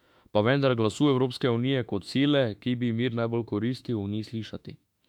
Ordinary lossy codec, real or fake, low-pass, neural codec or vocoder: none; fake; 19.8 kHz; autoencoder, 48 kHz, 32 numbers a frame, DAC-VAE, trained on Japanese speech